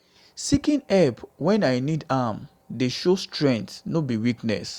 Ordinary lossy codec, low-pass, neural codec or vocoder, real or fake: Opus, 64 kbps; 19.8 kHz; none; real